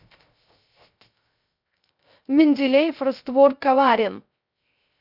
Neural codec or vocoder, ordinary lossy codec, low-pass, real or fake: codec, 16 kHz, 0.3 kbps, FocalCodec; none; 5.4 kHz; fake